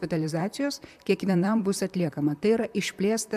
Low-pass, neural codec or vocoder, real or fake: 14.4 kHz; vocoder, 44.1 kHz, 128 mel bands, Pupu-Vocoder; fake